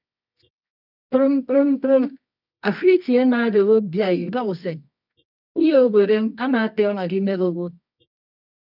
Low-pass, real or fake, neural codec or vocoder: 5.4 kHz; fake; codec, 24 kHz, 0.9 kbps, WavTokenizer, medium music audio release